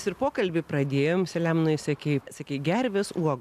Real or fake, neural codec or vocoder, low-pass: real; none; 14.4 kHz